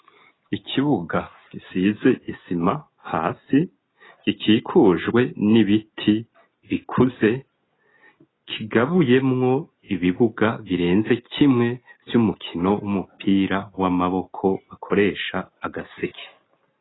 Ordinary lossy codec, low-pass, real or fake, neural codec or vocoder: AAC, 16 kbps; 7.2 kHz; real; none